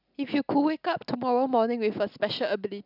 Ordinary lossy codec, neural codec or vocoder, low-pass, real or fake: none; none; 5.4 kHz; real